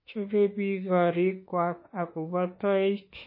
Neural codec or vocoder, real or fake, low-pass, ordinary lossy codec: autoencoder, 48 kHz, 32 numbers a frame, DAC-VAE, trained on Japanese speech; fake; 5.4 kHz; MP3, 24 kbps